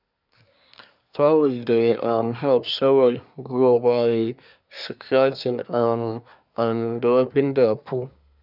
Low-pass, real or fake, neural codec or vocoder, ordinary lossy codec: 5.4 kHz; fake; codec, 24 kHz, 1 kbps, SNAC; none